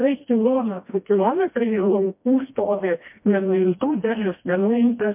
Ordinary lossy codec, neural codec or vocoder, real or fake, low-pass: MP3, 32 kbps; codec, 16 kHz, 1 kbps, FreqCodec, smaller model; fake; 3.6 kHz